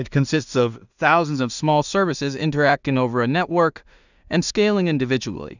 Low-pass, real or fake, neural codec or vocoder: 7.2 kHz; fake; codec, 16 kHz in and 24 kHz out, 0.4 kbps, LongCat-Audio-Codec, two codebook decoder